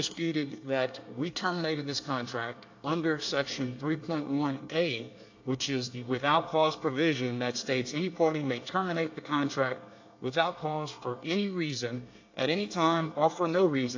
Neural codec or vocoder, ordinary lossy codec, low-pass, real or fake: codec, 24 kHz, 1 kbps, SNAC; AAC, 48 kbps; 7.2 kHz; fake